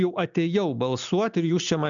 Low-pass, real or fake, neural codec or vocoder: 7.2 kHz; real; none